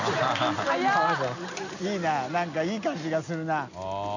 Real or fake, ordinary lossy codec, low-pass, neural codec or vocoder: real; none; 7.2 kHz; none